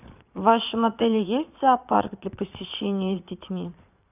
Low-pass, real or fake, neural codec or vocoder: 3.6 kHz; fake; vocoder, 22.05 kHz, 80 mel bands, Vocos